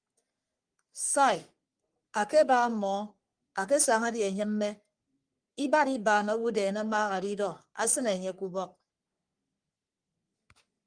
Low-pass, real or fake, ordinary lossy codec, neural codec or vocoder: 9.9 kHz; fake; Opus, 32 kbps; codec, 44.1 kHz, 3.4 kbps, Pupu-Codec